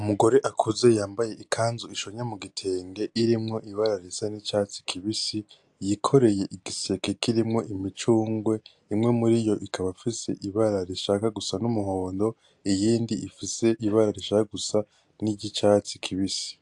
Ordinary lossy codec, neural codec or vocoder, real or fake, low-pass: AAC, 64 kbps; none; real; 10.8 kHz